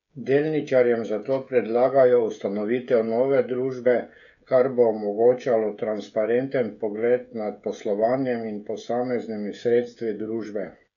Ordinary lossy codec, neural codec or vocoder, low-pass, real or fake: none; codec, 16 kHz, 16 kbps, FreqCodec, smaller model; 7.2 kHz; fake